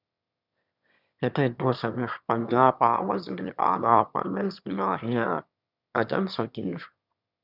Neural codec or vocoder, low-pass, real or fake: autoencoder, 22.05 kHz, a latent of 192 numbers a frame, VITS, trained on one speaker; 5.4 kHz; fake